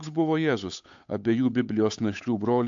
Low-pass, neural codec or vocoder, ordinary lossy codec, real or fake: 7.2 kHz; codec, 16 kHz, 8 kbps, FunCodec, trained on Chinese and English, 25 frames a second; AAC, 64 kbps; fake